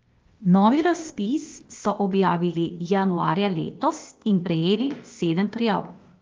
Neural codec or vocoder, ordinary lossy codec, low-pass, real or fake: codec, 16 kHz, 0.8 kbps, ZipCodec; Opus, 32 kbps; 7.2 kHz; fake